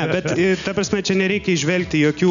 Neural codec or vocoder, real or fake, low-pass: none; real; 7.2 kHz